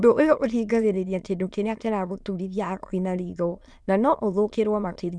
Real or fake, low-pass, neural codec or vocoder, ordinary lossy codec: fake; none; autoencoder, 22.05 kHz, a latent of 192 numbers a frame, VITS, trained on many speakers; none